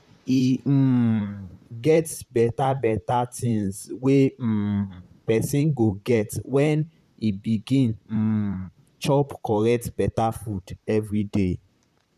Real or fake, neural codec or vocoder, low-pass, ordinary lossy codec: fake; vocoder, 44.1 kHz, 128 mel bands, Pupu-Vocoder; 14.4 kHz; none